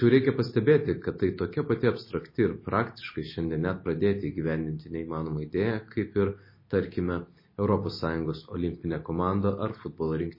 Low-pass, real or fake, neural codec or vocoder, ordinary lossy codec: 5.4 kHz; real; none; MP3, 24 kbps